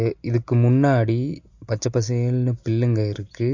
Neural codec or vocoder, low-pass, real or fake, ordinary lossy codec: none; 7.2 kHz; real; MP3, 48 kbps